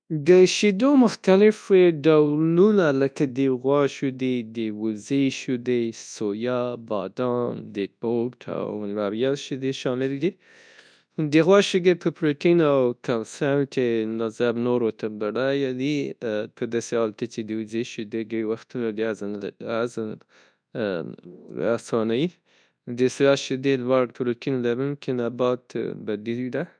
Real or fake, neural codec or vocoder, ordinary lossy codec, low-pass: fake; codec, 24 kHz, 0.9 kbps, WavTokenizer, large speech release; none; 9.9 kHz